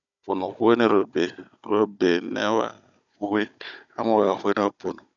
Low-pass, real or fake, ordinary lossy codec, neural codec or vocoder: 7.2 kHz; fake; none; codec, 16 kHz, 16 kbps, FunCodec, trained on Chinese and English, 50 frames a second